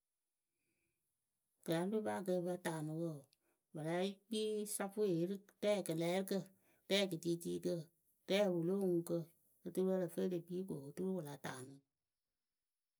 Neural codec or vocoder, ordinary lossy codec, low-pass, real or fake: none; none; none; real